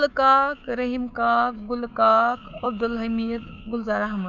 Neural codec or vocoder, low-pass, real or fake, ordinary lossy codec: codec, 24 kHz, 3.1 kbps, DualCodec; 7.2 kHz; fake; none